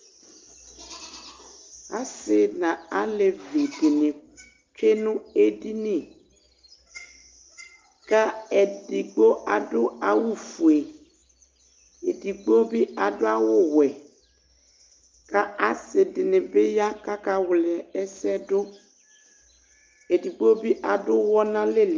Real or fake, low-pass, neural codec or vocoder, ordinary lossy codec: real; 7.2 kHz; none; Opus, 32 kbps